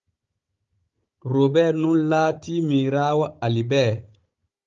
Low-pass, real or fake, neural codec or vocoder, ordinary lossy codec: 7.2 kHz; fake; codec, 16 kHz, 16 kbps, FunCodec, trained on Chinese and English, 50 frames a second; Opus, 32 kbps